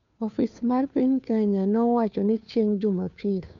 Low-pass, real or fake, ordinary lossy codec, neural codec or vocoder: 7.2 kHz; fake; MP3, 96 kbps; codec, 16 kHz, 2 kbps, FunCodec, trained on Chinese and English, 25 frames a second